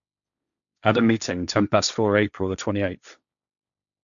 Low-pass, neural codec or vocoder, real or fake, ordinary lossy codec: 7.2 kHz; codec, 16 kHz, 1.1 kbps, Voila-Tokenizer; fake; none